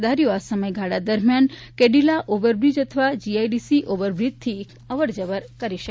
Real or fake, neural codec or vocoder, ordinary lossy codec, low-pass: real; none; none; none